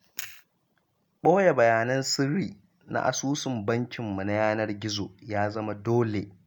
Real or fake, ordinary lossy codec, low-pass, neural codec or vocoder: real; none; none; none